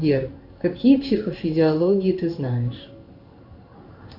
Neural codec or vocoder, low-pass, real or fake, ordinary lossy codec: codec, 16 kHz in and 24 kHz out, 1 kbps, XY-Tokenizer; 5.4 kHz; fake; AAC, 48 kbps